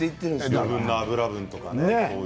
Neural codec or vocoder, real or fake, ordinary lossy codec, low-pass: none; real; none; none